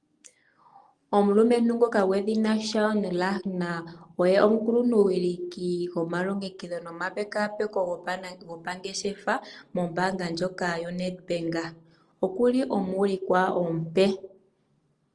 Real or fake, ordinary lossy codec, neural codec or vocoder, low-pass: real; Opus, 24 kbps; none; 10.8 kHz